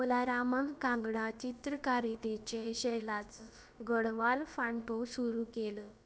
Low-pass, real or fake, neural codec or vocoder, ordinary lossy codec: none; fake; codec, 16 kHz, about 1 kbps, DyCAST, with the encoder's durations; none